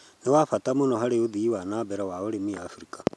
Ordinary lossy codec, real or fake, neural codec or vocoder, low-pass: none; real; none; none